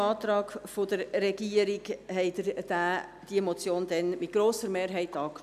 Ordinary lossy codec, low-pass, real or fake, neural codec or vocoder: none; 14.4 kHz; real; none